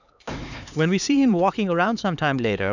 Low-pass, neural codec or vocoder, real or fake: 7.2 kHz; codec, 16 kHz, 4 kbps, X-Codec, HuBERT features, trained on LibriSpeech; fake